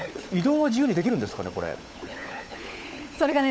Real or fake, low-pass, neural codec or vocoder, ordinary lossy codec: fake; none; codec, 16 kHz, 16 kbps, FunCodec, trained on LibriTTS, 50 frames a second; none